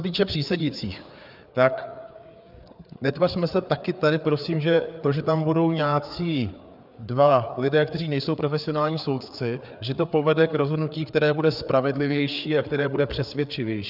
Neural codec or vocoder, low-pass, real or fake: codec, 16 kHz, 4 kbps, FreqCodec, larger model; 5.4 kHz; fake